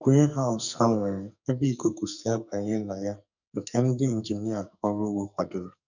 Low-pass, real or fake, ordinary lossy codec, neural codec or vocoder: 7.2 kHz; fake; none; codec, 44.1 kHz, 2.6 kbps, SNAC